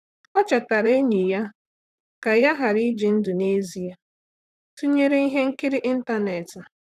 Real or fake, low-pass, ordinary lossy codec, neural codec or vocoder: fake; 14.4 kHz; none; vocoder, 44.1 kHz, 128 mel bands, Pupu-Vocoder